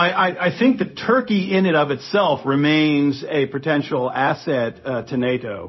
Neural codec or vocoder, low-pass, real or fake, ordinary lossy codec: codec, 16 kHz, 0.4 kbps, LongCat-Audio-Codec; 7.2 kHz; fake; MP3, 24 kbps